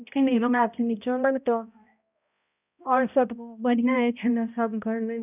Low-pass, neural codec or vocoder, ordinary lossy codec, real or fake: 3.6 kHz; codec, 16 kHz, 0.5 kbps, X-Codec, HuBERT features, trained on balanced general audio; none; fake